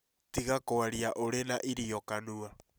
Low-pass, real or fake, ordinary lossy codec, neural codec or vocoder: none; real; none; none